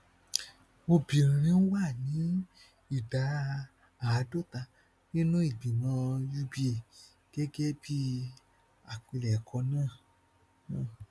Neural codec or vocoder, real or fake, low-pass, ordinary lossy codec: none; real; none; none